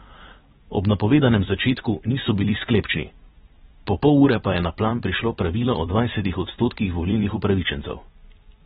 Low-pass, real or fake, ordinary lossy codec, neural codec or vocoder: 19.8 kHz; fake; AAC, 16 kbps; vocoder, 44.1 kHz, 128 mel bands every 256 samples, BigVGAN v2